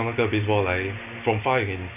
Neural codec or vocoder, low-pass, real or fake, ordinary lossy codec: none; 3.6 kHz; real; none